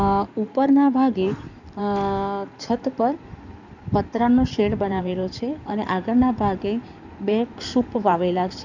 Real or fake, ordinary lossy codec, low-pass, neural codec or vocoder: fake; none; 7.2 kHz; codec, 16 kHz in and 24 kHz out, 2.2 kbps, FireRedTTS-2 codec